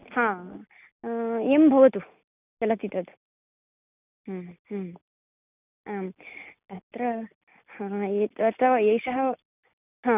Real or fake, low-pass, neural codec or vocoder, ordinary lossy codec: real; 3.6 kHz; none; none